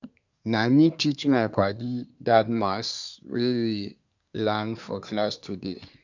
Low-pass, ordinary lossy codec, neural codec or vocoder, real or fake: 7.2 kHz; none; codec, 24 kHz, 1 kbps, SNAC; fake